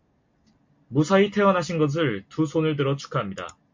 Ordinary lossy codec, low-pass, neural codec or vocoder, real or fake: MP3, 48 kbps; 7.2 kHz; none; real